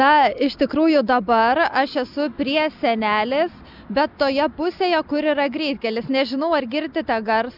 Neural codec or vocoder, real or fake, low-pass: none; real; 5.4 kHz